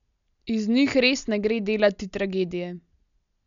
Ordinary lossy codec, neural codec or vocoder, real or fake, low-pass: none; none; real; 7.2 kHz